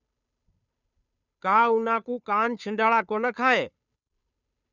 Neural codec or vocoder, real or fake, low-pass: codec, 16 kHz, 2 kbps, FunCodec, trained on Chinese and English, 25 frames a second; fake; 7.2 kHz